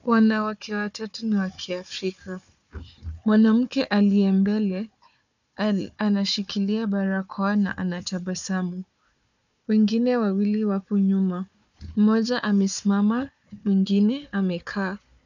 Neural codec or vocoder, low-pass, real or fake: codec, 16 kHz, 4 kbps, FunCodec, trained on Chinese and English, 50 frames a second; 7.2 kHz; fake